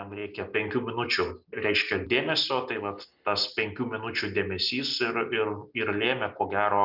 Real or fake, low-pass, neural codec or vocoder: real; 5.4 kHz; none